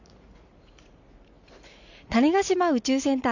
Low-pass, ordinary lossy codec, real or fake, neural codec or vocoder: 7.2 kHz; none; fake; vocoder, 44.1 kHz, 80 mel bands, Vocos